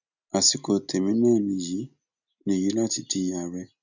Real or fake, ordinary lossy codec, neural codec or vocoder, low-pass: real; none; none; 7.2 kHz